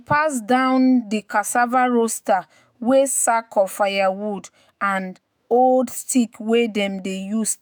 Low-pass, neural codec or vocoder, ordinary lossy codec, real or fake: none; autoencoder, 48 kHz, 128 numbers a frame, DAC-VAE, trained on Japanese speech; none; fake